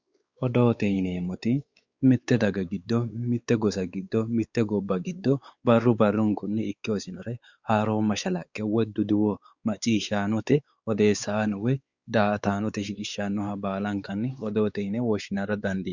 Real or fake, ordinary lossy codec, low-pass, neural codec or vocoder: fake; Opus, 64 kbps; 7.2 kHz; codec, 16 kHz, 4 kbps, X-Codec, WavLM features, trained on Multilingual LibriSpeech